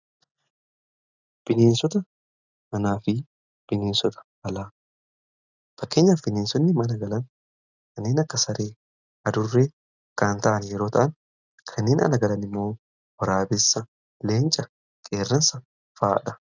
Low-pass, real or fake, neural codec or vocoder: 7.2 kHz; real; none